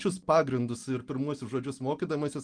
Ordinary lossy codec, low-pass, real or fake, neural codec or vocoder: Opus, 24 kbps; 9.9 kHz; real; none